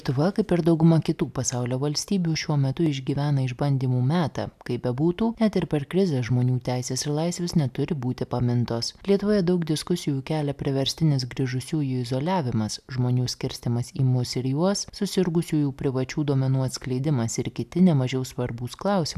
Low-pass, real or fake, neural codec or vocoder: 14.4 kHz; real; none